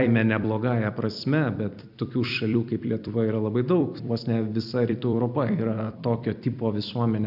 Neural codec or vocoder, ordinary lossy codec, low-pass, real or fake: vocoder, 44.1 kHz, 128 mel bands every 256 samples, BigVGAN v2; AAC, 48 kbps; 5.4 kHz; fake